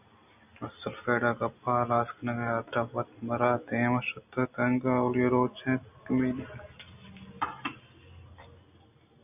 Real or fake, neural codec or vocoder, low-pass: real; none; 3.6 kHz